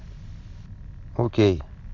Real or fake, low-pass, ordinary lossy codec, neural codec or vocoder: real; 7.2 kHz; AAC, 48 kbps; none